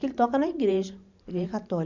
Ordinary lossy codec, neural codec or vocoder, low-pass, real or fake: none; vocoder, 44.1 kHz, 128 mel bands every 512 samples, BigVGAN v2; 7.2 kHz; fake